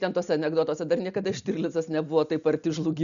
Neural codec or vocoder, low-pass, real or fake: none; 7.2 kHz; real